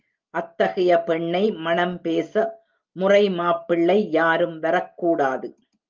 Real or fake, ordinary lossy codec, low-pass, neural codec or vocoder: real; Opus, 32 kbps; 7.2 kHz; none